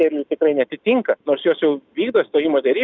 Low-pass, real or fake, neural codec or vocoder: 7.2 kHz; real; none